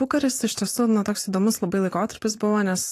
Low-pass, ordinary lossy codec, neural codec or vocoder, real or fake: 14.4 kHz; AAC, 48 kbps; vocoder, 44.1 kHz, 128 mel bands every 512 samples, BigVGAN v2; fake